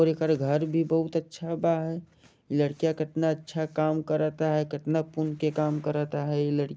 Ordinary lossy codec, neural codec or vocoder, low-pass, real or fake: none; none; none; real